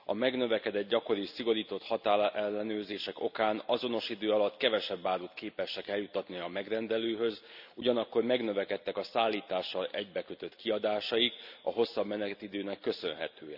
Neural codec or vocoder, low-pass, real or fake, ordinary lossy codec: none; 5.4 kHz; real; none